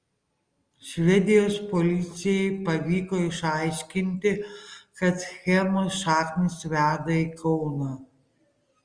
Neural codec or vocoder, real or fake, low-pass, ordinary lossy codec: none; real; 9.9 kHz; Opus, 32 kbps